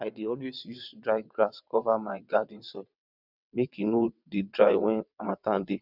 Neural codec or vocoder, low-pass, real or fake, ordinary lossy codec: vocoder, 22.05 kHz, 80 mel bands, WaveNeXt; 5.4 kHz; fake; none